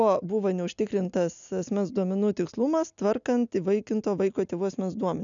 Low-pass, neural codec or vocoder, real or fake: 7.2 kHz; none; real